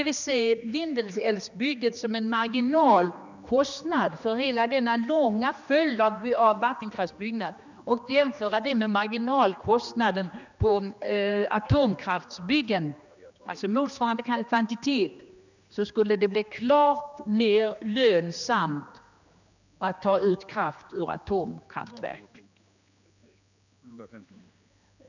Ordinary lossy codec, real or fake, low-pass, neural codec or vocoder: none; fake; 7.2 kHz; codec, 16 kHz, 2 kbps, X-Codec, HuBERT features, trained on general audio